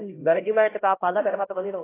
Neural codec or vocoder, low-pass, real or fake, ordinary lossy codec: codec, 16 kHz, 0.5 kbps, X-Codec, HuBERT features, trained on LibriSpeech; 3.6 kHz; fake; AAC, 16 kbps